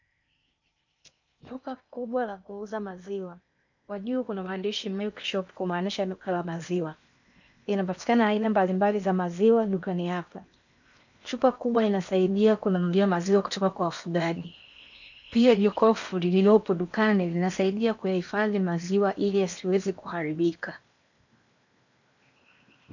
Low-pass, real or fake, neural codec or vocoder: 7.2 kHz; fake; codec, 16 kHz in and 24 kHz out, 0.8 kbps, FocalCodec, streaming, 65536 codes